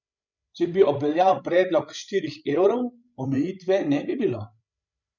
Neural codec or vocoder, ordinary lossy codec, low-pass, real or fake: codec, 16 kHz, 16 kbps, FreqCodec, larger model; none; 7.2 kHz; fake